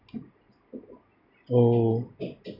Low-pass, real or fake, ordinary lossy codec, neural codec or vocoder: 5.4 kHz; real; none; none